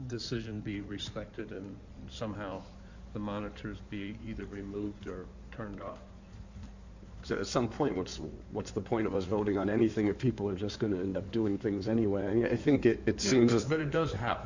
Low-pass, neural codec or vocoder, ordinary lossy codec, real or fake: 7.2 kHz; codec, 16 kHz in and 24 kHz out, 2.2 kbps, FireRedTTS-2 codec; Opus, 64 kbps; fake